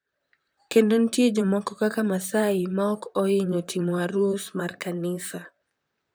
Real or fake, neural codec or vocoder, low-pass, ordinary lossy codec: fake; vocoder, 44.1 kHz, 128 mel bands, Pupu-Vocoder; none; none